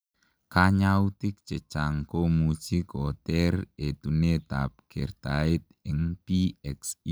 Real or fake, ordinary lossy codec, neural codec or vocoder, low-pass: real; none; none; none